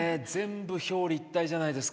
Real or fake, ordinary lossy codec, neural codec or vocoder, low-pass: real; none; none; none